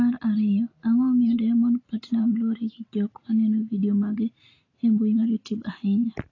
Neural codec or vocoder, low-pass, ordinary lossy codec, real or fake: none; 7.2 kHz; AAC, 32 kbps; real